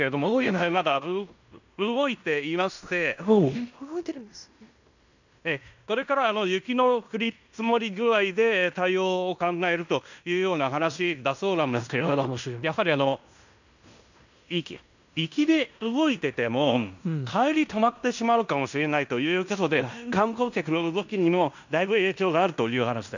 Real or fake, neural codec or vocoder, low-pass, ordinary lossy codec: fake; codec, 16 kHz in and 24 kHz out, 0.9 kbps, LongCat-Audio-Codec, fine tuned four codebook decoder; 7.2 kHz; none